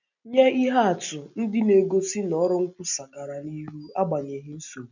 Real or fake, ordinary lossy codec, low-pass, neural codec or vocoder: real; none; 7.2 kHz; none